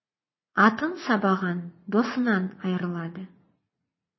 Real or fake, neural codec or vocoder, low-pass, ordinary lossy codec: fake; vocoder, 44.1 kHz, 80 mel bands, Vocos; 7.2 kHz; MP3, 24 kbps